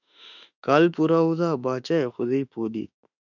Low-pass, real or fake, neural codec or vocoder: 7.2 kHz; fake; autoencoder, 48 kHz, 32 numbers a frame, DAC-VAE, trained on Japanese speech